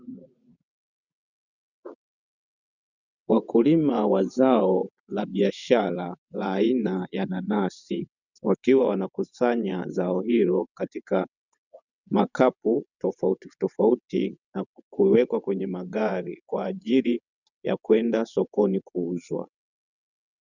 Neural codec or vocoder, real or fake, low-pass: vocoder, 22.05 kHz, 80 mel bands, WaveNeXt; fake; 7.2 kHz